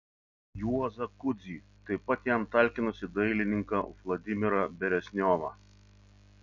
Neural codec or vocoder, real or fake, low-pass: none; real; 7.2 kHz